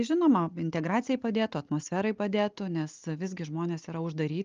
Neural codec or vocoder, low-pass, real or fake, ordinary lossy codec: none; 7.2 kHz; real; Opus, 32 kbps